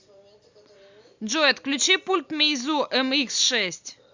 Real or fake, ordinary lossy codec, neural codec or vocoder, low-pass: real; Opus, 64 kbps; none; 7.2 kHz